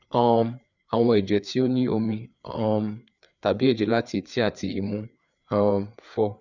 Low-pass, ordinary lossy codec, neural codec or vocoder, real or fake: 7.2 kHz; none; codec, 16 kHz, 4 kbps, FreqCodec, larger model; fake